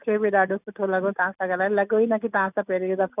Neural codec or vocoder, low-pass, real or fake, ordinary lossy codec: none; 3.6 kHz; real; none